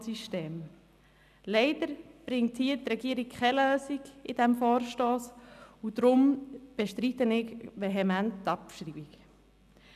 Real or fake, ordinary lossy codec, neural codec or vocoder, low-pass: real; none; none; 14.4 kHz